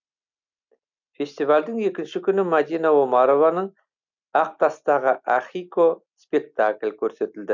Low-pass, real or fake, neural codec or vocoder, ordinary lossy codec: 7.2 kHz; real; none; none